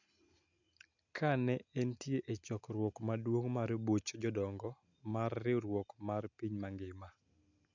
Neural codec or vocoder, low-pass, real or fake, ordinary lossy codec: none; 7.2 kHz; real; none